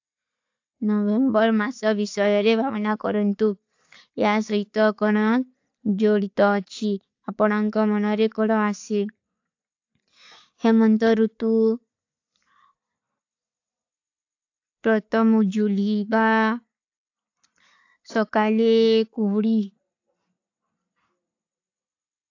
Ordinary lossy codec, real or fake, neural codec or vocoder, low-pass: AAC, 48 kbps; real; none; 7.2 kHz